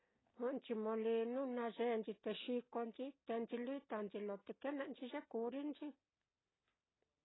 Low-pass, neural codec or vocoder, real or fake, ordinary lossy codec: 7.2 kHz; none; real; AAC, 16 kbps